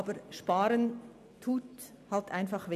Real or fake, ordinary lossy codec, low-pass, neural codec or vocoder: real; none; 14.4 kHz; none